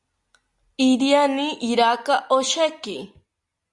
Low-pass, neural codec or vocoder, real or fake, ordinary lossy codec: 10.8 kHz; none; real; Opus, 64 kbps